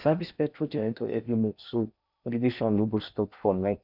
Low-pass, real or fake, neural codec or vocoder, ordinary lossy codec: 5.4 kHz; fake; codec, 16 kHz in and 24 kHz out, 0.8 kbps, FocalCodec, streaming, 65536 codes; none